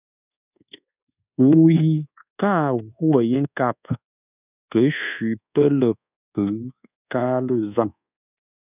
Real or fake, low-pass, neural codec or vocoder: fake; 3.6 kHz; codec, 24 kHz, 1.2 kbps, DualCodec